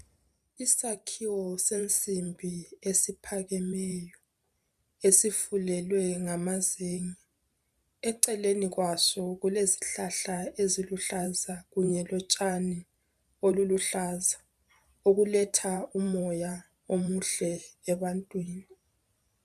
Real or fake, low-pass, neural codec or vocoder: fake; 14.4 kHz; vocoder, 44.1 kHz, 128 mel bands every 512 samples, BigVGAN v2